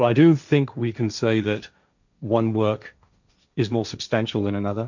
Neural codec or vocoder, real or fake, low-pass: codec, 16 kHz, 1.1 kbps, Voila-Tokenizer; fake; 7.2 kHz